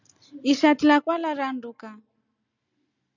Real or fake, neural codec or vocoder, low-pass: real; none; 7.2 kHz